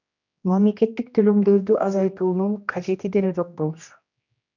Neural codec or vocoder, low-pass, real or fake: codec, 16 kHz, 1 kbps, X-Codec, HuBERT features, trained on general audio; 7.2 kHz; fake